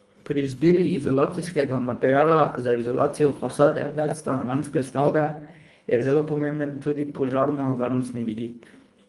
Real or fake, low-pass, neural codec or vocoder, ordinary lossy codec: fake; 10.8 kHz; codec, 24 kHz, 1.5 kbps, HILCodec; Opus, 32 kbps